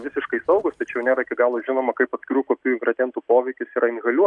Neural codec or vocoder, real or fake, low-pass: none; real; 10.8 kHz